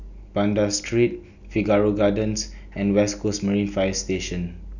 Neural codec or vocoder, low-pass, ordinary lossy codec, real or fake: none; 7.2 kHz; none; real